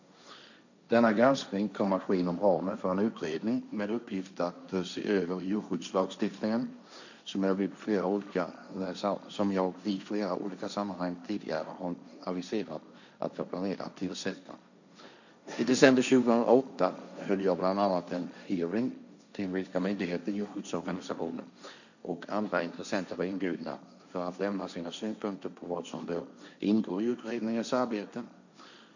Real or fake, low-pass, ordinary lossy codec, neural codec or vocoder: fake; 7.2 kHz; none; codec, 16 kHz, 1.1 kbps, Voila-Tokenizer